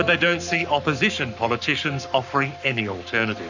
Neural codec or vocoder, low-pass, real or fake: codec, 44.1 kHz, 7.8 kbps, Pupu-Codec; 7.2 kHz; fake